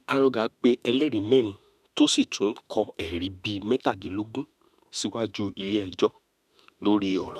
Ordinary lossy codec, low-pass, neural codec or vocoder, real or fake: none; 14.4 kHz; autoencoder, 48 kHz, 32 numbers a frame, DAC-VAE, trained on Japanese speech; fake